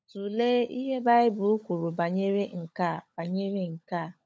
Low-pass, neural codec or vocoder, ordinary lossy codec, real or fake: none; codec, 16 kHz, 16 kbps, FunCodec, trained on LibriTTS, 50 frames a second; none; fake